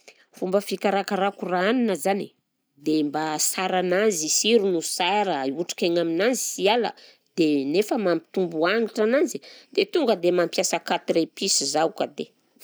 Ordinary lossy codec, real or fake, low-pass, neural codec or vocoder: none; real; none; none